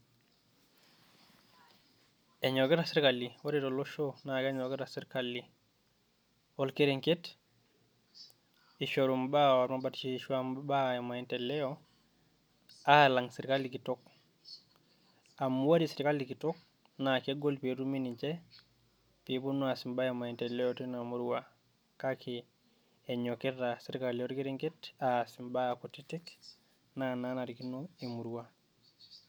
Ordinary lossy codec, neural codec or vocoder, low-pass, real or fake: none; none; none; real